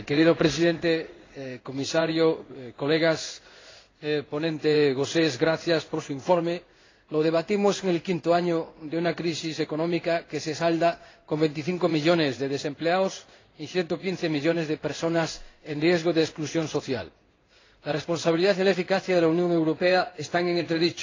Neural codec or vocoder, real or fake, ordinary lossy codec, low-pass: codec, 16 kHz in and 24 kHz out, 1 kbps, XY-Tokenizer; fake; AAC, 32 kbps; 7.2 kHz